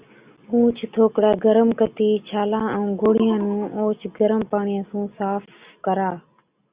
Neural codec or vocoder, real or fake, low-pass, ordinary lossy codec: none; real; 3.6 kHz; Opus, 64 kbps